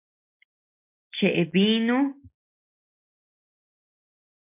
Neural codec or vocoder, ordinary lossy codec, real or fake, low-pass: none; MP3, 32 kbps; real; 3.6 kHz